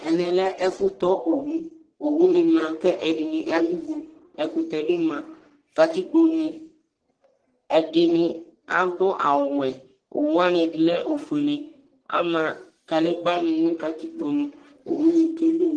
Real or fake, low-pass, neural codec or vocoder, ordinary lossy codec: fake; 9.9 kHz; codec, 44.1 kHz, 1.7 kbps, Pupu-Codec; Opus, 16 kbps